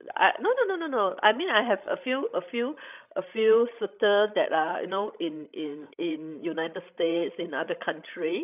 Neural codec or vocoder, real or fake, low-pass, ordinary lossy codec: codec, 16 kHz, 16 kbps, FreqCodec, larger model; fake; 3.6 kHz; none